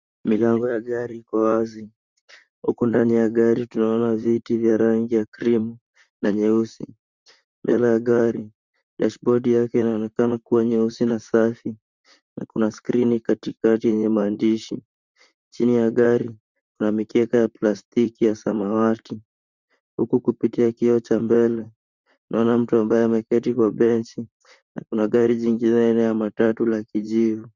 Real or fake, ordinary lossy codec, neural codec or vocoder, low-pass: fake; Opus, 64 kbps; vocoder, 44.1 kHz, 128 mel bands, Pupu-Vocoder; 7.2 kHz